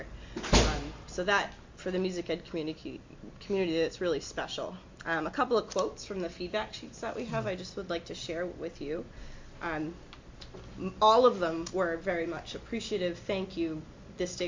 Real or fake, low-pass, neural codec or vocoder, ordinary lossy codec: real; 7.2 kHz; none; MP3, 48 kbps